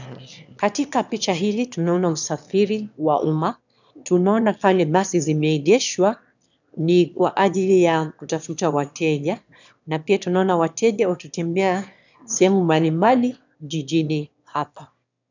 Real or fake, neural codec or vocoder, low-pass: fake; autoencoder, 22.05 kHz, a latent of 192 numbers a frame, VITS, trained on one speaker; 7.2 kHz